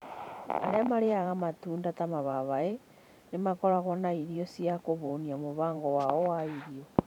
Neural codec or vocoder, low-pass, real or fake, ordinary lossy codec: vocoder, 44.1 kHz, 128 mel bands every 512 samples, BigVGAN v2; 19.8 kHz; fake; none